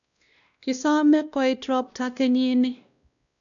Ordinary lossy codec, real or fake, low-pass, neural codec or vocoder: none; fake; 7.2 kHz; codec, 16 kHz, 1 kbps, X-Codec, WavLM features, trained on Multilingual LibriSpeech